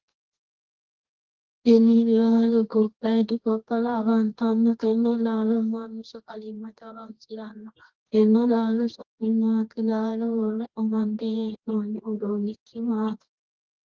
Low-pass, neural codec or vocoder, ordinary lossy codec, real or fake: 7.2 kHz; codec, 24 kHz, 0.9 kbps, WavTokenizer, medium music audio release; Opus, 16 kbps; fake